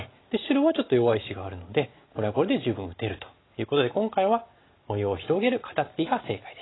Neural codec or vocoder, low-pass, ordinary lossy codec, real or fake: none; 7.2 kHz; AAC, 16 kbps; real